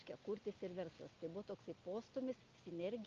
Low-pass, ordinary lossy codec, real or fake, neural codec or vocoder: 7.2 kHz; Opus, 24 kbps; real; none